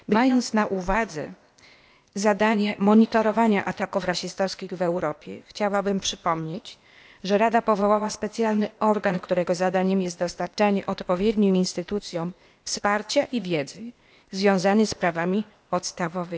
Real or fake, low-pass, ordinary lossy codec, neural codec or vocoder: fake; none; none; codec, 16 kHz, 0.8 kbps, ZipCodec